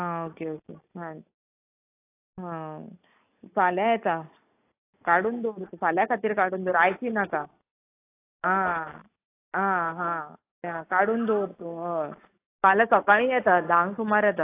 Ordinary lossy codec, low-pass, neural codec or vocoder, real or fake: AAC, 24 kbps; 3.6 kHz; none; real